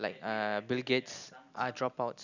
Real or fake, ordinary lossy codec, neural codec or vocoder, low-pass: real; none; none; 7.2 kHz